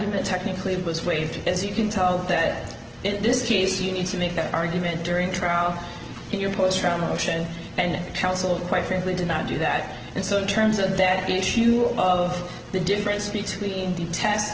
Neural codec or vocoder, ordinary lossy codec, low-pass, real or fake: none; Opus, 16 kbps; 7.2 kHz; real